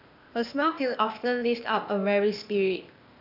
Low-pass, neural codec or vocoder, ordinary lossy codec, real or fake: 5.4 kHz; codec, 16 kHz, 0.8 kbps, ZipCodec; none; fake